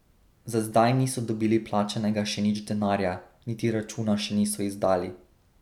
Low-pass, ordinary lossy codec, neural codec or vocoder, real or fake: 19.8 kHz; none; none; real